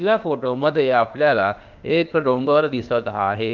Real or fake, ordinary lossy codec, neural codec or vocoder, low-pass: fake; none; codec, 16 kHz, 0.8 kbps, ZipCodec; 7.2 kHz